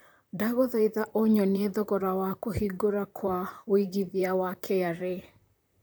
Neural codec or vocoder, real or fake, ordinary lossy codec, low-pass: vocoder, 44.1 kHz, 128 mel bands, Pupu-Vocoder; fake; none; none